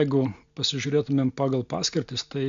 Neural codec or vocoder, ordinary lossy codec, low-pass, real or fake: none; MP3, 64 kbps; 7.2 kHz; real